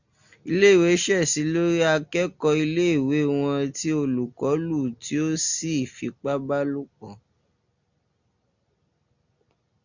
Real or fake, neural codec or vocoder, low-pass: real; none; 7.2 kHz